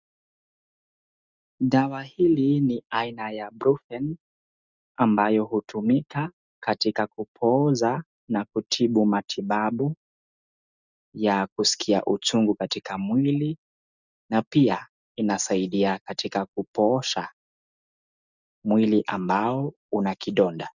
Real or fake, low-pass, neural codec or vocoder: real; 7.2 kHz; none